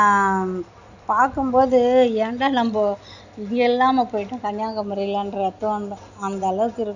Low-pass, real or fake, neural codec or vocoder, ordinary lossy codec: 7.2 kHz; real; none; none